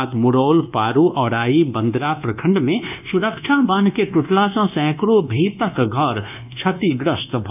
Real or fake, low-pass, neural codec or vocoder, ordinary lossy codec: fake; 3.6 kHz; codec, 24 kHz, 1.2 kbps, DualCodec; none